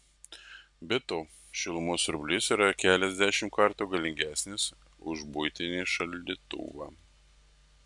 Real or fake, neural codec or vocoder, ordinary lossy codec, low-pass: real; none; MP3, 96 kbps; 10.8 kHz